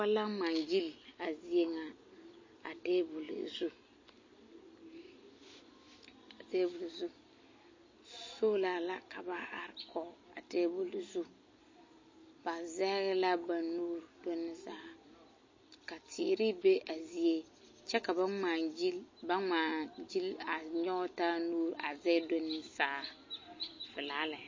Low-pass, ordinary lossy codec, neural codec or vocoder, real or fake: 7.2 kHz; MP3, 32 kbps; none; real